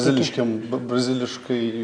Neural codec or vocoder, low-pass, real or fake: none; 9.9 kHz; real